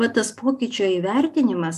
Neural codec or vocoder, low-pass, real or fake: none; 14.4 kHz; real